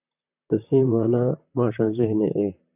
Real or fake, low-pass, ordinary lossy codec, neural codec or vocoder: fake; 3.6 kHz; AAC, 32 kbps; vocoder, 44.1 kHz, 128 mel bands, Pupu-Vocoder